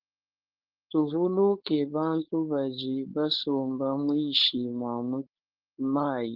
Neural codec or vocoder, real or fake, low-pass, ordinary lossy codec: codec, 16 kHz, 4.8 kbps, FACodec; fake; 5.4 kHz; Opus, 16 kbps